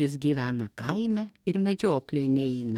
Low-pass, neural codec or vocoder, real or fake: 19.8 kHz; codec, 44.1 kHz, 2.6 kbps, DAC; fake